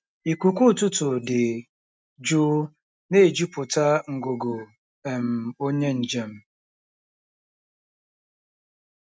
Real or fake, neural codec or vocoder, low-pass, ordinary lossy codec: real; none; none; none